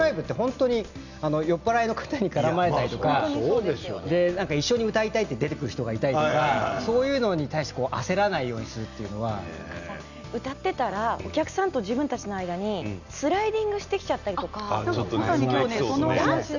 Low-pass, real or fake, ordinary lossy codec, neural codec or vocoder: 7.2 kHz; real; none; none